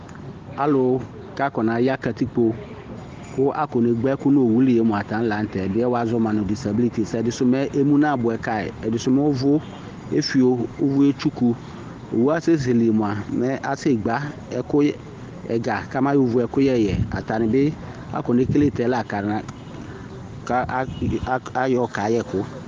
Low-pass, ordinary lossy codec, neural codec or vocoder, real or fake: 7.2 kHz; Opus, 16 kbps; none; real